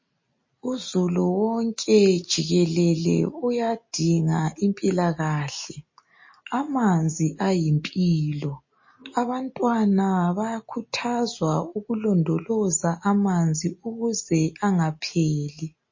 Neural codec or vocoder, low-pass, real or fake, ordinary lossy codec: none; 7.2 kHz; real; MP3, 32 kbps